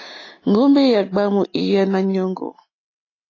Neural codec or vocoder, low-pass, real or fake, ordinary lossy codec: none; 7.2 kHz; real; AAC, 32 kbps